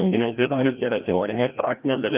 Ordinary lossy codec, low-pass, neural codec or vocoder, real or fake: Opus, 24 kbps; 3.6 kHz; codec, 16 kHz, 1 kbps, FreqCodec, larger model; fake